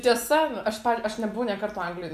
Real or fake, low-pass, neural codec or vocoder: real; 14.4 kHz; none